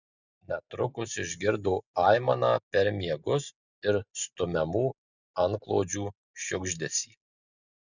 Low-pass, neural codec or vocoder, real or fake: 7.2 kHz; none; real